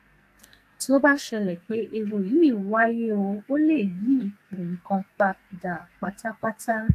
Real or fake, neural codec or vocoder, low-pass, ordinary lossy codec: fake; codec, 44.1 kHz, 2.6 kbps, SNAC; 14.4 kHz; AAC, 64 kbps